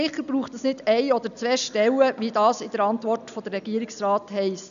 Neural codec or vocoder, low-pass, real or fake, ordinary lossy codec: none; 7.2 kHz; real; none